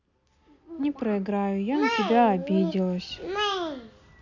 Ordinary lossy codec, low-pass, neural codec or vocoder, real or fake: none; 7.2 kHz; none; real